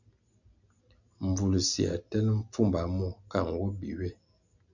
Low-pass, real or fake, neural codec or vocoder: 7.2 kHz; real; none